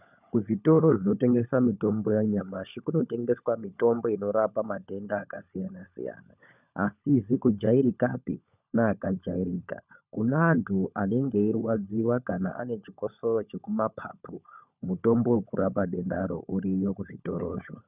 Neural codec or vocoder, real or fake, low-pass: codec, 16 kHz, 16 kbps, FunCodec, trained on LibriTTS, 50 frames a second; fake; 3.6 kHz